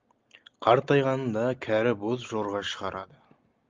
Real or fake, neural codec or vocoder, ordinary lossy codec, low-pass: real; none; Opus, 24 kbps; 7.2 kHz